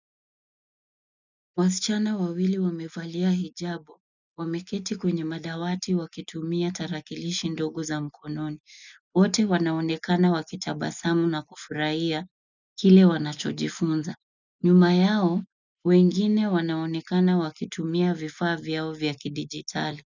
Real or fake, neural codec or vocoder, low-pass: real; none; 7.2 kHz